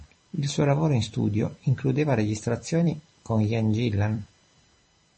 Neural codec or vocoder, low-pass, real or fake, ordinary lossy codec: vocoder, 44.1 kHz, 128 mel bands every 256 samples, BigVGAN v2; 10.8 kHz; fake; MP3, 32 kbps